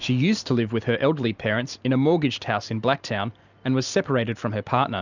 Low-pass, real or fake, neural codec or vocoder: 7.2 kHz; real; none